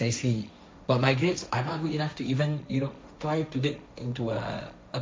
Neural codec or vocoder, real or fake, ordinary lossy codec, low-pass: codec, 16 kHz, 1.1 kbps, Voila-Tokenizer; fake; none; none